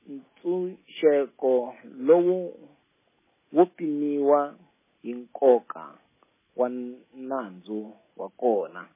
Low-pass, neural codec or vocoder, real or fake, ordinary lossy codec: 3.6 kHz; none; real; MP3, 16 kbps